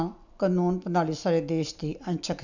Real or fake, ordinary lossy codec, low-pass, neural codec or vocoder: real; none; 7.2 kHz; none